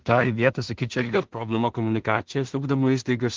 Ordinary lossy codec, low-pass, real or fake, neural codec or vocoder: Opus, 16 kbps; 7.2 kHz; fake; codec, 16 kHz in and 24 kHz out, 0.4 kbps, LongCat-Audio-Codec, two codebook decoder